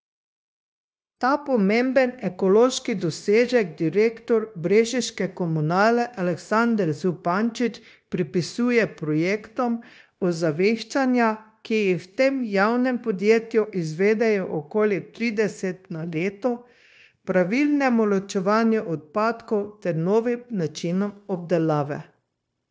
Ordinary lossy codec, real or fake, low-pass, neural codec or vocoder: none; fake; none; codec, 16 kHz, 0.9 kbps, LongCat-Audio-Codec